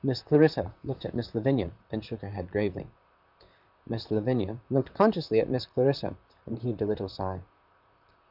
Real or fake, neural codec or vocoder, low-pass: fake; vocoder, 44.1 kHz, 128 mel bands, Pupu-Vocoder; 5.4 kHz